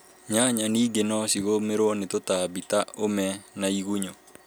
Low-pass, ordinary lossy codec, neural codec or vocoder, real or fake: none; none; none; real